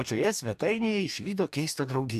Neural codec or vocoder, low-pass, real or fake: codec, 44.1 kHz, 2.6 kbps, DAC; 14.4 kHz; fake